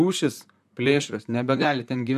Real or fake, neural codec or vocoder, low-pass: fake; vocoder, 44.1 kHz, 128 mel bands, Pupu-Vocoder; 14.4 kHz